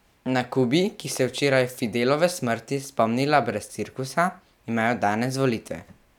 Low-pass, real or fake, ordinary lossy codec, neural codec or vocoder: 19.8 kHz; real; none; none